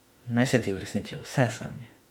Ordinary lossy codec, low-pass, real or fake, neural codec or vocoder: MP3, 96 kbps; 19.8 kHz; fake; autoencoder, 48 kHz, 32 numbers a frame, DAC-VAE, trained on Japanese speech